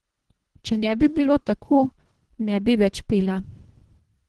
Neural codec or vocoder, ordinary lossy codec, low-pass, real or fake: codec, 24 kHz, 1.5 kbps, HILCodec; Opus, 16 kbps; 10.8 kHz; fake